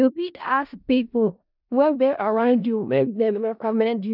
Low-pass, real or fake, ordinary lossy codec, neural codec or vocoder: 5.4 kHz; fake; none; codec, 16 kHz in and 24 kHz out, 0.4 kbps, LongCat-Audio-Codec, four codebook decoder